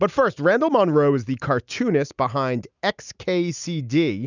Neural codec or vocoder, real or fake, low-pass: none; real; 7.2 kHz